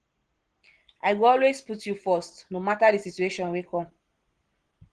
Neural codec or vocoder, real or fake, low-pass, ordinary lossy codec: none; real; 9.9 kHz; Opus, 16 kbps